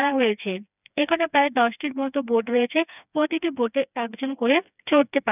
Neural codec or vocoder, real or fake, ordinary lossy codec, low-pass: codec, 16 kHz, 2 kbps, FreqCodec, smaller model; fake; none; 3.6 kHz